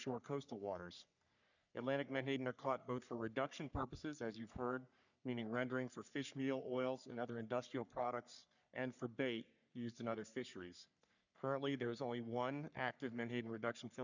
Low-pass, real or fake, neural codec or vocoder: 7.2 kHz; fake; codec, 44.1 kHz, 3.4 kbps, Pupu-Codec